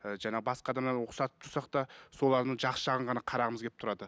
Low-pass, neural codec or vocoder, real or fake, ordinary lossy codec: none; none; real; none